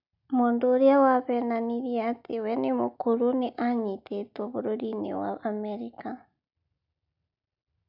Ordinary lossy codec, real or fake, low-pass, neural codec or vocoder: none; real; 5.4 kHz; none